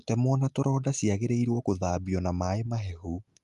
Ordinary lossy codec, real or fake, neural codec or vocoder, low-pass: Opus, 32 kbps; real; none; 10.8 kHz